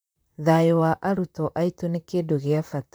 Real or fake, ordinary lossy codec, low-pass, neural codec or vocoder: fake; none; none; vocoder, 44.1 kHz, 128 mel bands, Pupu-Vocoder